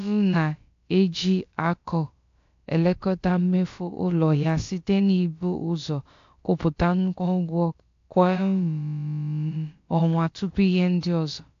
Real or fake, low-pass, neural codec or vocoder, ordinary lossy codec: fake; 7.2 kHz; codec, 16 kHz, about 1 kbps, DyCAST, with the encoder's durations; AAC, 48 kbps